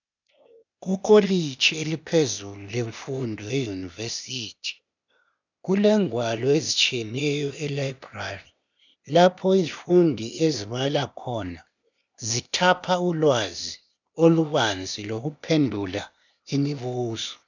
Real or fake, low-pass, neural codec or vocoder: fake; 7.2 kHz; codec, 16 kHz, 0.8 kbps, ZipCodec